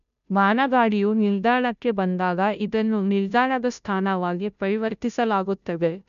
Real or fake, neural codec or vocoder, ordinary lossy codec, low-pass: fake; codec, 16 kHz, 0.5 kbps, FunCodec, trained on Chinese and English, 25 frames a second; none; 7.2 kHz